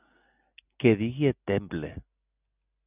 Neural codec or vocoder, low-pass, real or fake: none; 3.6 kHz; real